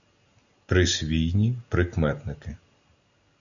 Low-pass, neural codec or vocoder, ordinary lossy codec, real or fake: 7.2 kHz; none; MP3, 96 kbps; real